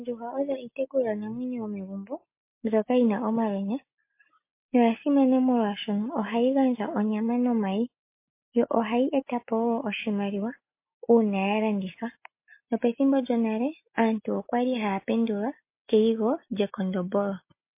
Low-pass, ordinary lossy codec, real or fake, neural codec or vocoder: 3.6 kHz; MP3, 24 kbps; real; none